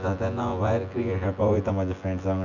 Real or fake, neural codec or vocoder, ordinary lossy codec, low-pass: fake; vocoder, 24 kHz, 100 mel bands, Vocos; none; 7.2 kHz